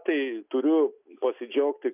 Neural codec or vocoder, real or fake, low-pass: none; real; 3.6 kHz